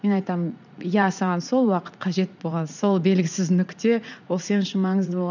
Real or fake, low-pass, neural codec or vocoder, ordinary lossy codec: fake; 7.2 kHz; vocoder, 44.1 kHz, 80 mel bands, Vocos; none